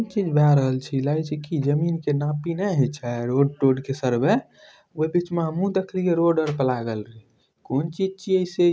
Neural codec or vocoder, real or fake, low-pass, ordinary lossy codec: none; real; none; none